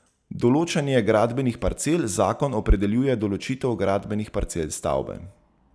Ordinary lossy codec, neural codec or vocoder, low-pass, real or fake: none; none; none; real